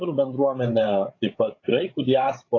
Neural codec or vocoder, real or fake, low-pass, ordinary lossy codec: codec, 16 kHz, 16 kbps, FreqCodec, larger model; fake; 7.2 kHz; AAC, 32 kbps